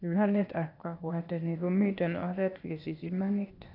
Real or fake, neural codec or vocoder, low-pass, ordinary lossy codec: fake; codec, 16 kHz, 0.8 kbps, ZipCodec; 5.4 kHz; none